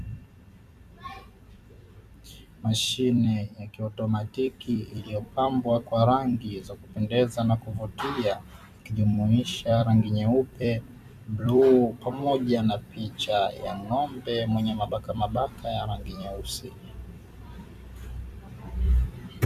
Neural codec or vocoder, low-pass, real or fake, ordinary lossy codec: vocoder, 44.1 kHz, 128 mel bands every 256 samples, BigVGAN v2; 14.4 kHz; fake; MP3, 96 kbps